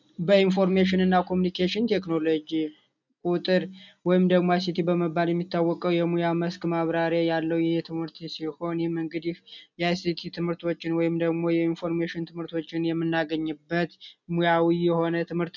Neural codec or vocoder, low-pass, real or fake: none; 7.2 kHz; real